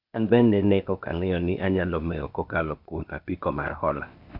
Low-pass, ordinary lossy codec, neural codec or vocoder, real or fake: 5.4 kHz; none; codec, 16 kHz, 0.8 kbps, ZipCodec; fake